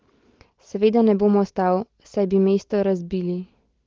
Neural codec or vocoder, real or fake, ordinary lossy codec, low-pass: none; real; Opus, 16 kbps; 7.2 kHz